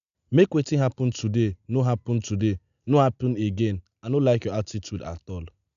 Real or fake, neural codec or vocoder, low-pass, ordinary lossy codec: real; none; 7.2 kHz; none